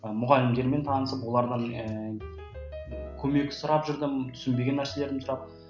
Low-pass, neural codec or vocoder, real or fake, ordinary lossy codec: 7.2 kHz; none; real; none